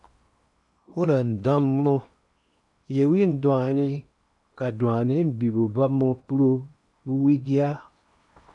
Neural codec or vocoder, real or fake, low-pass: codec, 16 kHz in and 24 kHz out, 0.8 kbps, FocalCodec, streaming, 65536 codes; fake; 10.8 kHz